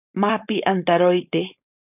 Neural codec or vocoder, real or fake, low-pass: codec, 16 kHz, 4.8 kbps, FACodec; fake; 3.6 kHz